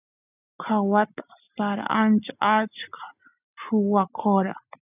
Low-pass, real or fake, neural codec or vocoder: 3.6 kHz; real; none